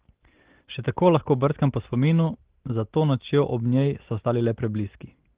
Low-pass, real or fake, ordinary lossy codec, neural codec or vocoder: 3.6 kHz; real; Opus, 16 kbps; none